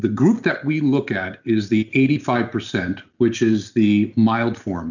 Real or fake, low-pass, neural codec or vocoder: real; 7.2 kHz; none